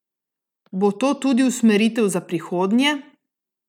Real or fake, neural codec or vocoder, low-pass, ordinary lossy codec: real; none; 19.8 kHz; none